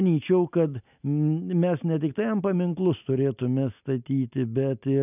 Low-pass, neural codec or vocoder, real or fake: 3.6 kHz; none; real